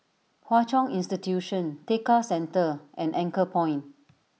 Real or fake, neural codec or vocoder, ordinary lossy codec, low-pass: real; none; none; none